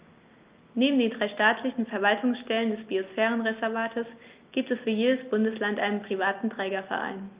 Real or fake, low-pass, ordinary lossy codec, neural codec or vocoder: real; 3.6 kHz; Opus, 32 kbps; none